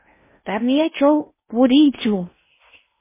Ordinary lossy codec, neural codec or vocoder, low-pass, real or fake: MP3, 16 kbps; codec, 16 kHz in and 24 kHz out, 0.6 kbps, FocalCodec, streaming, 2048 codes; 3.6 kHz; fake